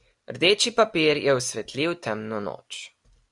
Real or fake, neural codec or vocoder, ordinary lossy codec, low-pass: real; none; MP3, 96 kbps; 10.8 kHz